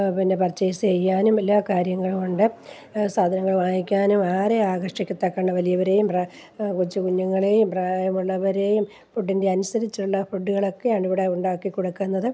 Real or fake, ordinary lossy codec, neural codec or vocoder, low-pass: real; none; none; none